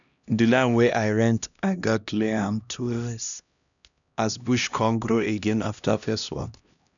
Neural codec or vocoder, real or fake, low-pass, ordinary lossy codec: codec, 16 kHz, 1 kbps, X-Codec, HuBERT features, trained on LibriSpeech; fake; 7.2 kHz; none